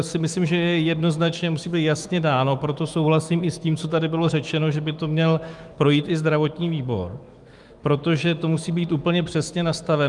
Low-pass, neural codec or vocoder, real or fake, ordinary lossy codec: 10.8 kHz; autoencoder, 48 kHz, 128 numbers a frame, DAC-VAE, trained on Japanese speech; fake; Opus, 32 kbps